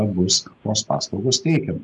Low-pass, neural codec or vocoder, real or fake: 10.8 kHz; none; real